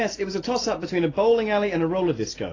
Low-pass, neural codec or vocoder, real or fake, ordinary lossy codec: 7.2 kHz; none; real; AAC, 32 kbps